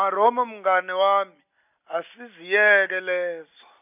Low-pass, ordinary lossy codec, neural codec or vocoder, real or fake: 3.6 kHz; none; none; real